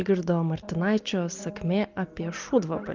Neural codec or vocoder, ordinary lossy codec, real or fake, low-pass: none; Opus, 24 kbps; real; 7.2 kHz